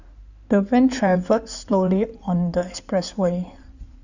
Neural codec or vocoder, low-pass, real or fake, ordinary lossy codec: codec, 16 kHz in and 24 kHz out, 2.2 kbps, FireRedTTS-2 codec; 7.2 kHz; fake; none